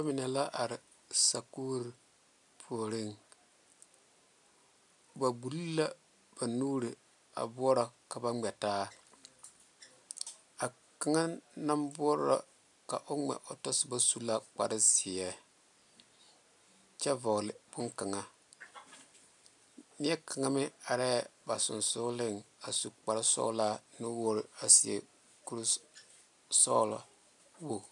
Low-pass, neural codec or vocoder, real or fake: 10.8 kHz; none; real